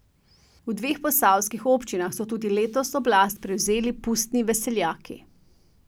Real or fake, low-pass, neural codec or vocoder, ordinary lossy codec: real; none; none; none